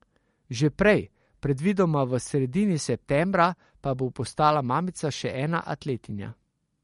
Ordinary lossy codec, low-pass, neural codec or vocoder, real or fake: MP3, 48 kbps; 19.8 kHz; none; real